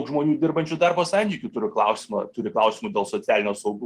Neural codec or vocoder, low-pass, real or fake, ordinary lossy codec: none; 14.4 kHz; real; Opus, 24 kbps